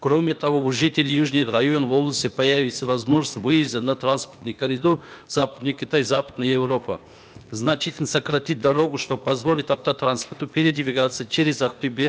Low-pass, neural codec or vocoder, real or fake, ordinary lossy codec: none; codec, 16 kHz, 0.8 kbps, ZipCodec; fake; none